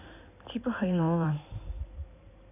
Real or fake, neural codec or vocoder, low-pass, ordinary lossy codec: fake; codec, 16 kHz in and 24 kHz out, 2.2 kbps, FireRedTTS-2 codec; 3.6 kHz; none